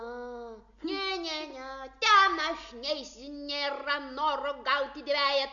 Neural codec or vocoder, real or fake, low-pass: none; real; 7.2 kHz